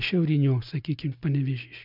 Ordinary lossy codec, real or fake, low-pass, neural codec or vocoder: MP3, 48 kbps; real; 5.4 kHz; none